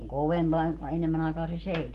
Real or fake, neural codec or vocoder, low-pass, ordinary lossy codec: real; none; 14.4 kHz; Opus, 16 kbps